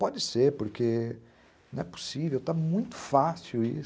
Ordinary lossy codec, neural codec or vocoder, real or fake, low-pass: none; none; real; none